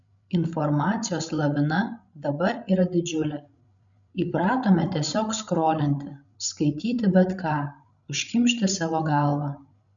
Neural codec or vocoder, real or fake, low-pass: codec, 16 kHz, 16 kbps, FreqCodec, larger model; fake; 7.2 kHz